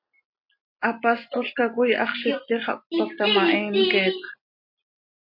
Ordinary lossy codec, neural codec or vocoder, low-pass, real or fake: MP3, 32 kbps; none; 5.4 kHz; real